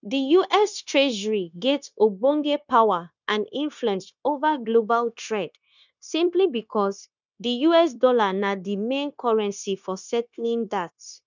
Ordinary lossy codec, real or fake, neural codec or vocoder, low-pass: none; fake; codec, 16 kHz, 0.9 kbps, LongCat-Audio-Codec; 7.2 kHz